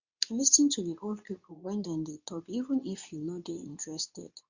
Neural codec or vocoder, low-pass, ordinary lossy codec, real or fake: codec, 24 kHz, 0.9 kbps, WavTokenizer, medium speech release version 2; 7.2 kHz; Opus, 64 kbps; fake